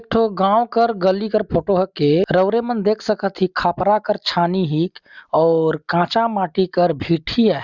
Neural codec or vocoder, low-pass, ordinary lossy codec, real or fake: none; 7.2 kHz; none; real